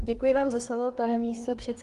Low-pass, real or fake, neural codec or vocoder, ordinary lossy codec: 10.8 kHz; fake; codec, 24 kHz, 1 kbps, SNAC; Opus, 24 kbps